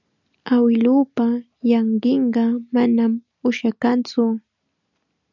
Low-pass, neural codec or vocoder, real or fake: 7.2 kHz; none; real